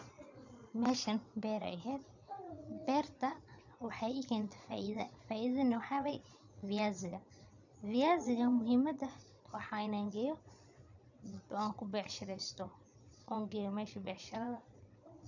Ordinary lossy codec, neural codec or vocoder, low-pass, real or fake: none; vocoder, 22.05 kHz, 80 mel bands, Vocos; 7.2 kHz; fake